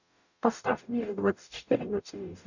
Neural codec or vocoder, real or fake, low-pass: codec, 44.1 kHz, 0.9 kbps, DAC; fake; 7.2 kHz